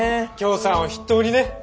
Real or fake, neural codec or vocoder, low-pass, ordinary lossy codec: real; none; none; none